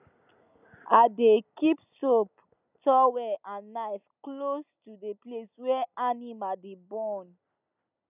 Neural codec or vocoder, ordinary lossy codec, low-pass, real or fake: none; none; 3.6 kHz; real